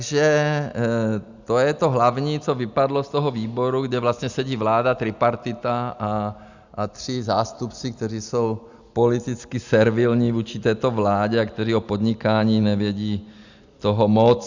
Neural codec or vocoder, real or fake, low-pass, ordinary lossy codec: none; real; 7.2 kHz; Opus, 64 kbps